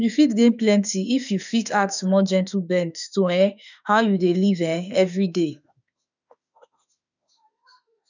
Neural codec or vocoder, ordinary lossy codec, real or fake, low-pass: autoencoder, 48 kHz, 32 numbers a frame, DAC-VAE, trained on Japanese speech; none; fake; 7.2 kHz